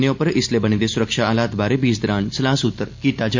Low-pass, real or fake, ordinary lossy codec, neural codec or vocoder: 7.2 kHz; real; MP3, 48 kbps; none